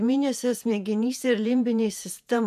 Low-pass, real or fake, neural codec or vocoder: 14.4 kHz; fake; vocoder, 48 kHz, 128 mel bands, Vocos